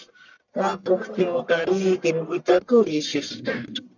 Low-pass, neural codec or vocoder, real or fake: 7.2 kHz; codec, 44.1 kHz, 1.7 kbps, Pupu-Codec; fake